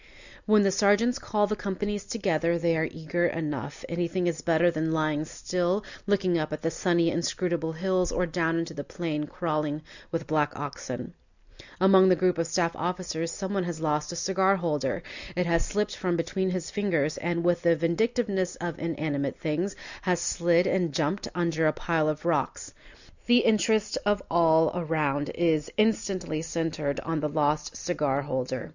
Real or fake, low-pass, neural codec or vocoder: real; 7.2 kHz; none